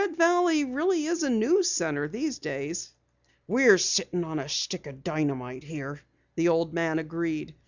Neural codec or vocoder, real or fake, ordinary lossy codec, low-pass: none; real; Opus, 64 kbps; 7.2 kHz